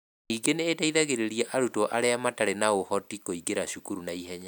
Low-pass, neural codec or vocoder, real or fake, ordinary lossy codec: none; none; real; none